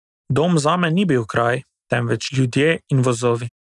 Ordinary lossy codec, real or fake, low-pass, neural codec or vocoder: none; real; 10.8 kHz; none